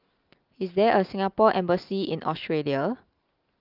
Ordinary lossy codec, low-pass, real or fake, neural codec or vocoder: Opus, 24 kbps; 5.4 kHz; real; none